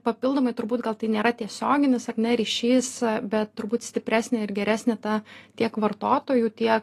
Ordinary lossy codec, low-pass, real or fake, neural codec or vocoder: AAC, 48 kbps; 14.4 kHz; real; none